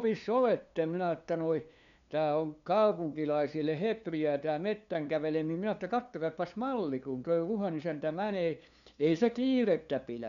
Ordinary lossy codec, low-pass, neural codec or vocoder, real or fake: none; 7.2 kHz; codec, 16 kHz, 2 kbps, FunCodec, trained on LibriTTS, 25 frames a second; fake